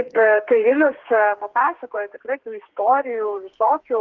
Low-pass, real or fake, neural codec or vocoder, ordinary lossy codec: 7.2 kHz; fake; codec, 32 kHz, 1.9 kbps, SNAC; Opus, 16 kbps